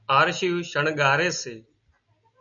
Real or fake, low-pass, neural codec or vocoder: real; 7.2 kHz; none